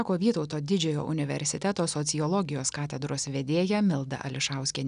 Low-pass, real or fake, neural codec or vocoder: 9.9 kHz; real; none